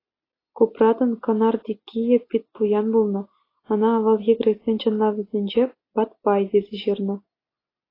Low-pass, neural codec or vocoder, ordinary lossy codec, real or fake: 5.4 kHz; none; AAC, 24 kbps; real